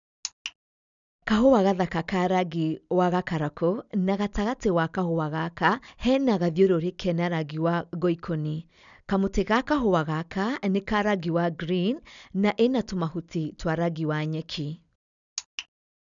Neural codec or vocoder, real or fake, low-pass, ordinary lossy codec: none; real; 7.2 kHz; none